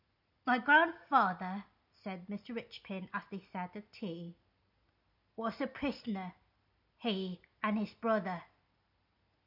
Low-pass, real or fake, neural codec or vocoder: 5.4 kHz; real; none